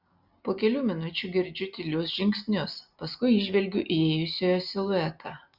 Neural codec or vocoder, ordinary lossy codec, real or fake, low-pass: none; Opus, 64 kbps; real; 5.4 kHz